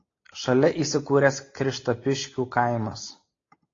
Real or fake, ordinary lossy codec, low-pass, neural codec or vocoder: real; AAC, 32 kbps; 7.2 kHz; none